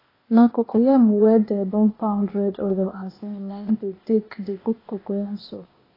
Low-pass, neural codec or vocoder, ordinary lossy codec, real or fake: 5.4 kHz; codec, 16 kHz, 0.8 kbps, ZipCodec; AAC, 24 kbps; fake